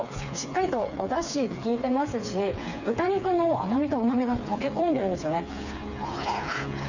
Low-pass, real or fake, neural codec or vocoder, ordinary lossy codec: 7.2 kHz; fake; codec, 16 kHz, 4 kbps, FreqCodec, smaller model; none